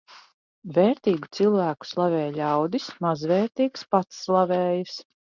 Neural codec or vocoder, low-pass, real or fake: none; 7.2 kHz; real